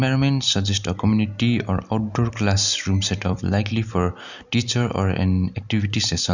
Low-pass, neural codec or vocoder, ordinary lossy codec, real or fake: 7.2 kHz; none; none; real